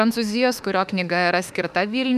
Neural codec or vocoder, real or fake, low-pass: autoencoder, 48 kHz, 32 numbers a frame, DAC-VAE, trained on Japanese speech; fake; 14.4 kHz